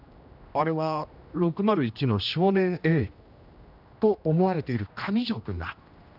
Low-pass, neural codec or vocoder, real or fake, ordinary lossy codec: 5.4 kHz; codec, 16 kHz, 1 kbps, X-Codec, HuBERT features, trained on general audio; fake; none